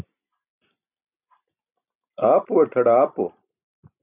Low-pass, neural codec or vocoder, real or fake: 3.6 kHz; none; real